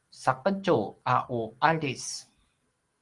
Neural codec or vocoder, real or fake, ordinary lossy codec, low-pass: none; real; Opus, 24 kbps; 10.8 kHz